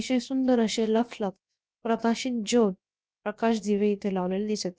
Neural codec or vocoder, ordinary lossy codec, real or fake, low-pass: codec, 16 kHz, about 1 kbps, DyCAST, with the encoder's durations; none; fake; none